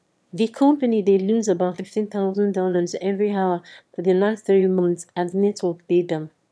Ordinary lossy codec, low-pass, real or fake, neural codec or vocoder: none; none; fake; autoencoder, 22.05 kHz, a latent of 192 numbers a frame, VITS, trained on one speaker